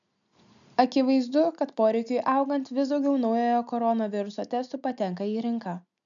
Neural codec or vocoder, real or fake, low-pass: none; real; 7.2 kHz